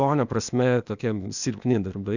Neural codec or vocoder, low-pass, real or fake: codec, 16 kHz, 0.8 kbps, ZipCodec; 7.2 kHz; fake